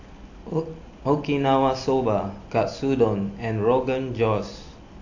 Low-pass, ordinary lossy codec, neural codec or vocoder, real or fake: 7.2 kHz; AAC, 48 kbps; none; real